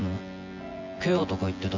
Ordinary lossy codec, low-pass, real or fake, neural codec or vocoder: AAC, 48 kbps; 7.2 kHz; fake; vocoder, 24 kHz, 100 mel bands, Vocos